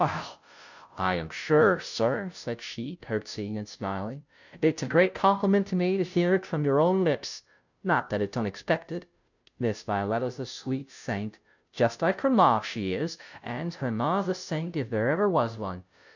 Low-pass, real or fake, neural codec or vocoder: 7.2 kHz; fake; codec, 16 kHz, 0.5 kbps, FunCodec, trained on Chinese and English, 25 frames a second